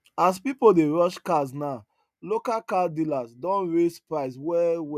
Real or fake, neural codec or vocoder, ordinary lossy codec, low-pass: real; none; none; 14.4 kHz